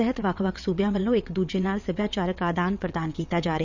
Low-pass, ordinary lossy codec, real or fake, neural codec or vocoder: 7.2 kHz; none; fake; vocoder, 22.05 kHz, 80 mel bands, WaveNeXt